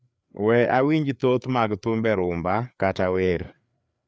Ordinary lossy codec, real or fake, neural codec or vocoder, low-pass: none; fake; codec, 16 kHz, 4 kbps, FreqCodec, larger model; none